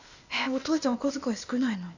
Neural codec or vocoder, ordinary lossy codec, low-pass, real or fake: codec, 16 kHz, 0.8 kbps, ZipCodec; none; 7.2 kHz; fake